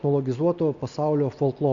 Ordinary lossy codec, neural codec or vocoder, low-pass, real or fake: Opus, 64 kbps; none; 7.2 kHz; real